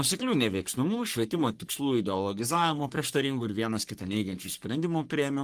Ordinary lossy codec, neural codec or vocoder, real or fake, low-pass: Opus, 24 kbps; codec, 44.1 kHz, 3.4 kbps, Pupu-Codec; fake; 14.4 kHz